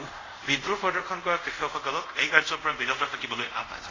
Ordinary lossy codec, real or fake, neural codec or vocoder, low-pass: AAC, 32 kbps; fake; codec, 24 kHz, 0.5 kbps, DualCodec; 7.2 kHz